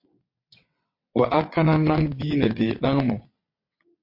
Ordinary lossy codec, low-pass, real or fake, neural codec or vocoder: MP3, 32 kbps; 5.4 kHz; fake; vocoder, 22.05 kHz, 80 mel bands, WaveNeXt